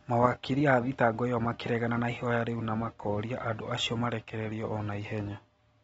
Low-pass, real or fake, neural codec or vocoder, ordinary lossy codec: 19.8 kHz; real; none; AAC, 24 kbps